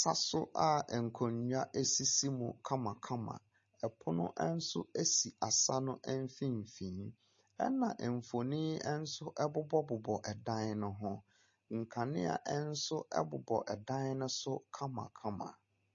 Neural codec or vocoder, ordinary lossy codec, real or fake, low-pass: none; MP3, 32 kbps; real; 7.2 kHz